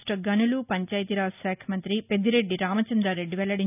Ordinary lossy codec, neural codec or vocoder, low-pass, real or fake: none; none; 3.6 kHz; real